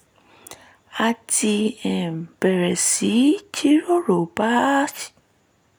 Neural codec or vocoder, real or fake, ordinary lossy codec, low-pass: none; real; none; none